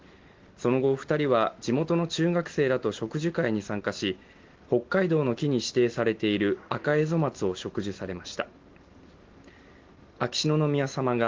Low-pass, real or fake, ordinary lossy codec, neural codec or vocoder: 7.2 kHz; real; Opus, 16 kbps; none